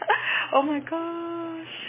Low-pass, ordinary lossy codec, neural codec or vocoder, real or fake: 3.6 kHz; MP3, 16 kbps; none; real